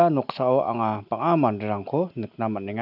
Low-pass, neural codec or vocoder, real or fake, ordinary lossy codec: 5.4 kHz; none; real; none